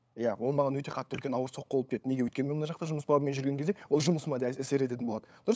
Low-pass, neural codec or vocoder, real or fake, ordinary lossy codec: none; codec, 16 kHz, 8 kbps, FunCodec, trained on LibriTTS, 25 frames a second; fake; none